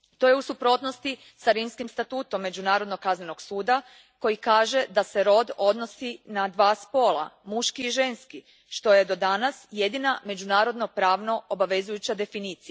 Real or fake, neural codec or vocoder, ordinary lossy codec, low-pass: real; none; none; none